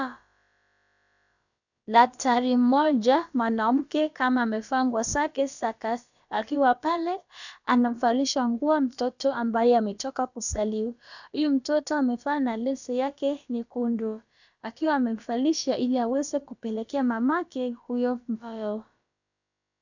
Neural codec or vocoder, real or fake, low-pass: codec, 16 kHz, about 1 kbps, DyCAST, with the encoder's durations; fake; 7.2 kHz